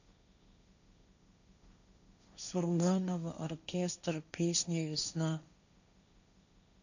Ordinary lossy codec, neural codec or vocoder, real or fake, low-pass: none; codec, 16 kHz, 1.1 kbps, Voila-Tokenizer; fake; 7.2 kHz